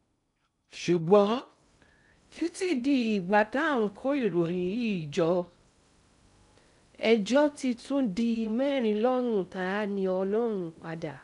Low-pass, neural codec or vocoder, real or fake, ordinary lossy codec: 10.8 kHz; codec, 16 kHz in and 24 kHz out, 0.6 kbps, FocalCodec, streaming, 2048 codes; fake; none